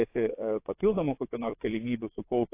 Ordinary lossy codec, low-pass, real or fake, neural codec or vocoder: AAC, 24 kbps; 3.6 kHz; fake; vocoder, 22.05 kHz, 80 mel bands, WaveNeXt